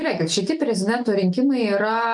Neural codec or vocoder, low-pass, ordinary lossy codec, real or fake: none; 10.8 kHz; MP3, 64 kbps; real